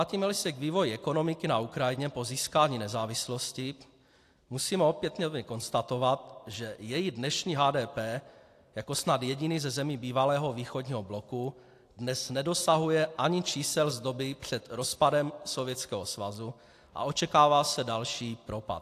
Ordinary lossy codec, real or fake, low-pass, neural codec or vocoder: AAC, 64 kbps; real; 14.4 kHz; none